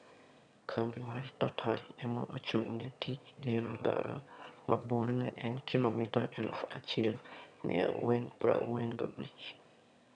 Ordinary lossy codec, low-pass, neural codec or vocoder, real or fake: none; 9.9 kHz; autoencoder, 22.05 kHz, a latent of 192 numbers a frame, VITS, trained on one speaker; fake